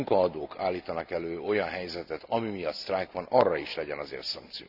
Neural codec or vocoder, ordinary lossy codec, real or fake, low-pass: none; none; real; 5.4 kHz